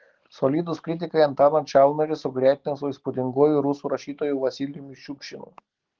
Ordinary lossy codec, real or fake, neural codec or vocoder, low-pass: Opus, 16 kbps; real; none; 7.2 kHz